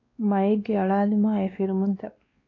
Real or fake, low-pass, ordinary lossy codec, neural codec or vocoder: fake; 7.2 kHz; AAC, 32 kbps; codec, 16 kHz, 2 kbps, X-Codec, WavLM features, trained on Multilingual LibriSpeech